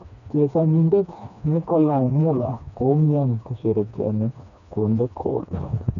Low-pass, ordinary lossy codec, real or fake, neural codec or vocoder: 7.2 kHz; none; fake; codec, 16 kHz, 2 kbps, FreqCodec, smaller model